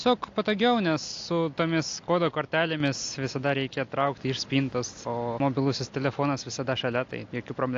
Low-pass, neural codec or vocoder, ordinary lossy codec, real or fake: 7.2 kHz; none; AAC, 48 kbps; real